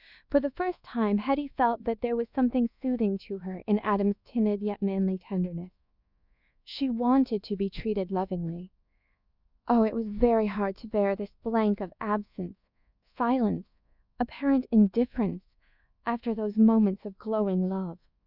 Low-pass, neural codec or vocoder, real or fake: 5.4 kHz; codec, 24 kHz, 1.2 kbps, DualCodec; fake